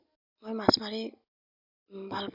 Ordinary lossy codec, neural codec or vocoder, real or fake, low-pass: Opus, 64 kbps; none; real; 5.4 kHz